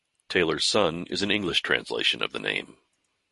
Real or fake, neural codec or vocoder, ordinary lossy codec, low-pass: real; none; MP3, 48 kbps; 14.4 kHz